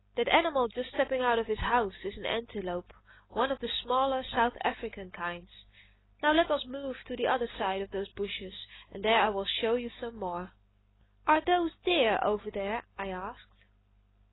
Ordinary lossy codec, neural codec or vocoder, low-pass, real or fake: AAC, 16 kbps; none; 7.2 kHz; real